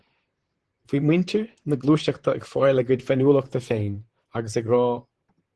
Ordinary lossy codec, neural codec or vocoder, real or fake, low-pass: Opus, 16 kbps; vocoder, 44.1 kHz, 128 mel bands, Pupu-Vocoder; fake; 10.8 kHz